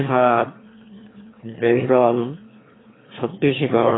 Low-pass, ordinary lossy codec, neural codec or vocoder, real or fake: 7.2 kHz; AAC, 16 kbps; autoencoder, 22.05 kHz, a latent of 192 numbers a frame, VITS, trained on one speaker; fake